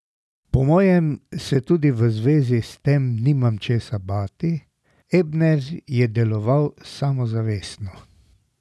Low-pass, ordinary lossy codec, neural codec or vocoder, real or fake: none; none; none; real